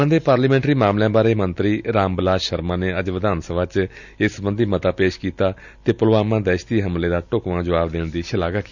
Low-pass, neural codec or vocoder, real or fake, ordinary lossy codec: 7.2 kHz; none; real; none